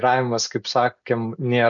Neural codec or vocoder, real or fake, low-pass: none; real; 7.2 kHz